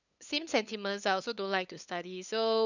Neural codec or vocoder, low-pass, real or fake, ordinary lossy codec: codec, 16 kHz, 8 kbps, FunCodec, trained on LibriTTS, 25 frames a second; 7.2 kHz; fake; none